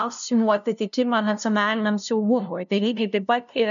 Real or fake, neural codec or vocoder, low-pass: fake; codec, 16 kHz, 0.5 kbps, FunCodec, trained on LibriTTS, 25 frames a second; 7.2 kHz